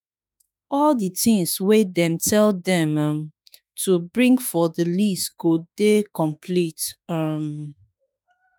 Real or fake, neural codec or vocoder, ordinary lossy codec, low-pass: fake; autoencoder, 48 kHz, 32 numbers a frame, DAC-VAE, trained on Japanese speech; none; none